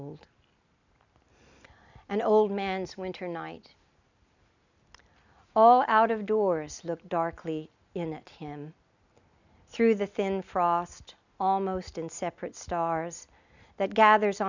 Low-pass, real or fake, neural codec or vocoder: 7.2 kHz; real; none